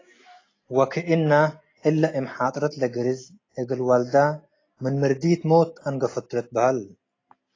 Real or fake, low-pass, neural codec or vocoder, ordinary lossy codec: real; 7.2 kHz; none; AAC, 32 kbps